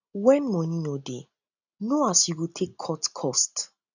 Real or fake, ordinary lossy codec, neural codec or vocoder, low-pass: real; none; none; 7.2 kHz